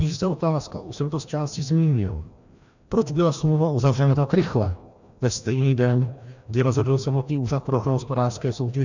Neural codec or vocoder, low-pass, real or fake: codec, 16 kHz, 1 kbps, FreqCodec, larger model; 7.2 kHz; fake